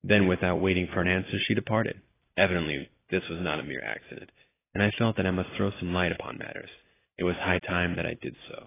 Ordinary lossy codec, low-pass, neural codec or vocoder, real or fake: AAC, 16 kbps; 3.6 kHz; none; real